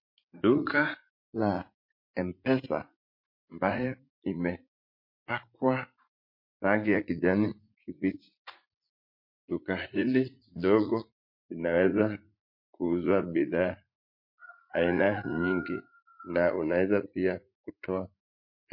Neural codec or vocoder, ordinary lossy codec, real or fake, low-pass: vocoder, 44.1 kHz, 80 mel bands, Vocos; MP3, 32 kbps; fake; 5.4 kHz